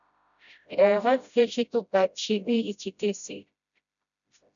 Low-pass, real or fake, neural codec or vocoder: 7.2 kHz; fake; codec, 16 kHz, 0.5 kbps, FreqCodec, smaller model